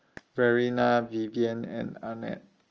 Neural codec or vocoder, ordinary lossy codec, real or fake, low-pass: none; Opus, 24 kbps; real; 7.2 kHz